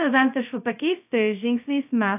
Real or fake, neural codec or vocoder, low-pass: fake; codec, 16 kHz, 0.2 kbps, FocalCodec; 3.6 kHz